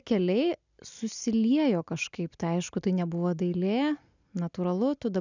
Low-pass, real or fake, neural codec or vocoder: 7.2 kHz; real; none